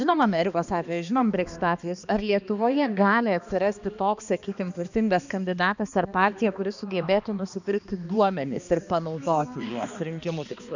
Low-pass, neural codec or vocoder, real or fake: 7.2 kHz; codec, 16 kHz, 2 kbps, X-Codec, HuBERT features, trained on balanced general audio; fake